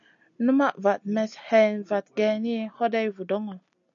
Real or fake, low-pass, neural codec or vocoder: real; 7.2 kHz; none